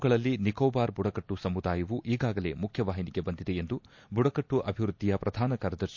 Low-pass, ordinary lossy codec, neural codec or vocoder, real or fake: 7.2 kHz; MP3, 48 kbps; none; real